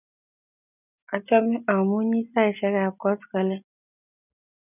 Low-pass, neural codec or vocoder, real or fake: 3.6 kHz; none; real